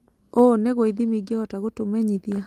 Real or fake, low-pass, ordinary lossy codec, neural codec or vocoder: real; 14.4 kHz; Opus, 24 kbps; none